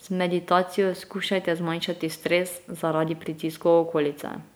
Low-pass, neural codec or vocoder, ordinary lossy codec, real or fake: none; none; none; real